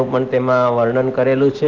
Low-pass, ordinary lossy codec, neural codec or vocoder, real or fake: 7.2 kHz; Opus, 32 kbps; none; real